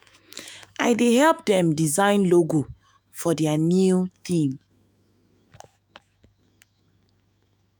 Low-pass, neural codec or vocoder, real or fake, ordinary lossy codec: none; autoencoder, 48 kHz, 128 numbers a frame, DAC-VAE, trained on Japanese speech; fake; none